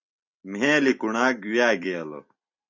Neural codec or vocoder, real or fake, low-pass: vocoder, 44.1 kHz, 128 mel bands every 256 samples, BigVGAN v2; fake; 7.2 kHz